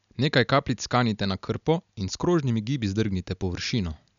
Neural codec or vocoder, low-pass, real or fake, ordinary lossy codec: none; 7.2 kHz; real; none